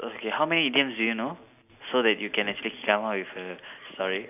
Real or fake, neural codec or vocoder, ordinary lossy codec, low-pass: real; none; none; 3.6 kHz